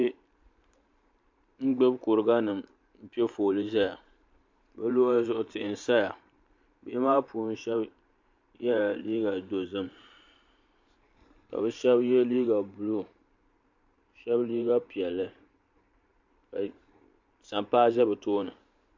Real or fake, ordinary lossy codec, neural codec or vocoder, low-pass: fake; MP3, 48 kbps; vocoder, 44.1 kHz, 128 mel bands every 512 samples, BigVGAN v2; 7.2 kHz